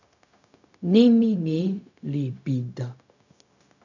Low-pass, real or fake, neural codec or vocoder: 7.2 kHz; fake; codec, 16 kHz, 0.4 kbps, LongCat-Audio-Codec